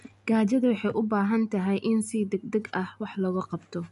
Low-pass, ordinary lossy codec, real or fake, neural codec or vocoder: 10.8 kHz; none; real; none